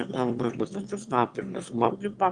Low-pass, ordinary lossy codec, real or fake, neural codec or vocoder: 9.9 kHz; Opus, 24 kbps; fake; autoencoder, 22.05 kHz, a latent of 192 numbers a frame, VITS, trained on one speaker